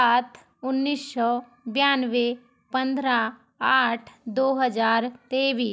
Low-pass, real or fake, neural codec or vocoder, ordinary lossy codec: none; real; none; none